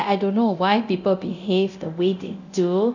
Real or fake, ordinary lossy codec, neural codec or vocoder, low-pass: fake; none; codec, 24 kHz, 0.9 kbps, DualCodec; 7.2 kHz